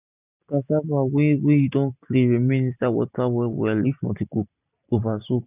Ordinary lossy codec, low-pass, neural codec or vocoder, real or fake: none; 3.6 kHz; none; real